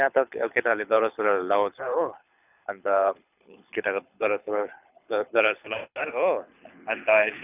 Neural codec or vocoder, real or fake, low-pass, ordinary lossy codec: codec, 24 kHz, 6 kbps, HILCodec; fake; 3.6 kHz; none